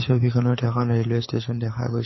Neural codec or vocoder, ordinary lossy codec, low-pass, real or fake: codec, 24 kHz, 6 kbps, HILCodec; MP3, 24 kbps; 7.2 kHz; fake